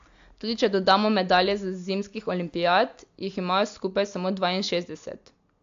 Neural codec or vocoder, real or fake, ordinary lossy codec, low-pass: none; real; AAC, 64 kbps; 7.2 kHz